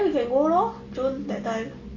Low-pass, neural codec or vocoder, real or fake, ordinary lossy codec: 7.2 kHz; none; real; none